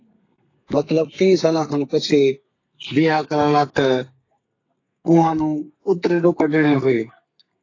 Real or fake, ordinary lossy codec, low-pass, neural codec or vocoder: fake; AAC, 32 kbps; 7.2 kHz; codec, 32 kHz, 1.9 kbps, SNAC